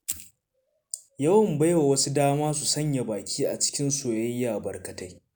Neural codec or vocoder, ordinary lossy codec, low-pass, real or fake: none; none; none; real